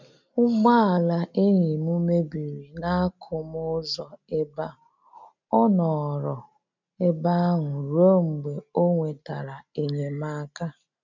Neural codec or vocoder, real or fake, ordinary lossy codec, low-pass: none; real; none; 7.2 kHz